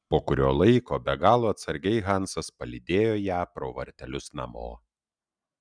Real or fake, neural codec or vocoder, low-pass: real; none; 9.9 kHz